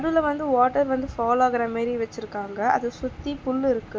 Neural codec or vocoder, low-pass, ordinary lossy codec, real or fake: none; none; none; real